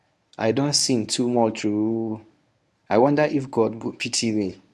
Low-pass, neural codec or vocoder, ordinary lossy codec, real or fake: none; codec, 24 kHz, 0.9 kbps, WavTokenizer, medium speech release version 1; none; fake